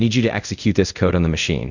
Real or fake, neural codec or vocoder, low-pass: fake; codec, 24 kHz, 0.5 kbps, DualCodec; 7.2 kHz